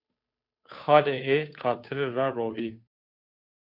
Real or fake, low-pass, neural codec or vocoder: fake; 5.4 kHz; codec, 16 kHz, 2 kbps, FunCodec, trained on Chinese and English, 25 frames a second